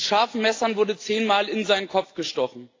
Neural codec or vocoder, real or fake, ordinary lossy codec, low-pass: none; real; AAC, 32 kbps; 7.2 kHz